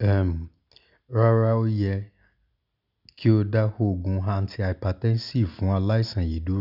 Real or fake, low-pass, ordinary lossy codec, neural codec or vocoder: real; 5.4 kHz; none; none